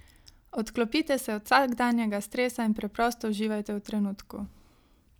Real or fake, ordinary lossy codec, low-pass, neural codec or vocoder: real; none; none; none